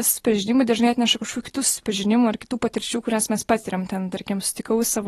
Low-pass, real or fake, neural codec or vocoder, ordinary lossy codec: 19.8 kHz; real; none; AAC, 32 kbps